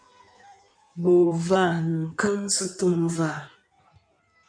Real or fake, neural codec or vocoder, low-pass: fake; codec, 16 kHz in and 24 kHz out, 1.1 kbps, FireRedTTS-2 codec; 9.9 kHz